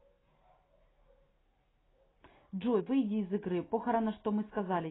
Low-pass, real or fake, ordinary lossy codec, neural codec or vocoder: 7.2 kHz; real; AAC, 16 kbps; none